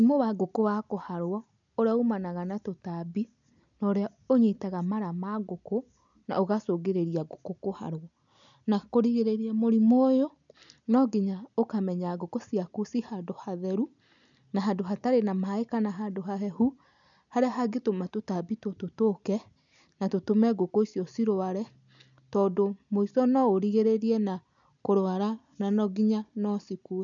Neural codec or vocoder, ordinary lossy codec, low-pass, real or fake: none; none; 7.2 kHz; real